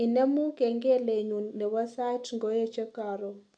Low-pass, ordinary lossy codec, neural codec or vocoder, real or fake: 9.9 kHz; none; none; real